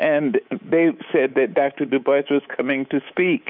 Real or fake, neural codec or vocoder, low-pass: fake; codec, 24 kHz, 3.1 kbps, DualCodec; 5.4 kHz